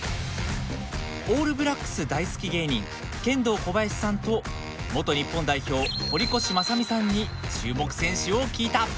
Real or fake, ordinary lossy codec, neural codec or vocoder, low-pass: real; none; none; none